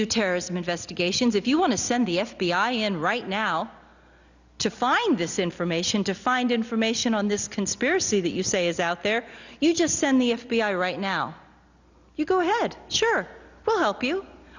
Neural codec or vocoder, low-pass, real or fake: none; 7.2 kHz; real